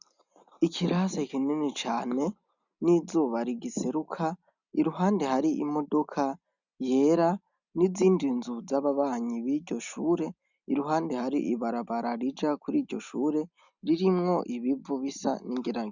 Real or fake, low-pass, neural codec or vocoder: real; 7.2 kHz; none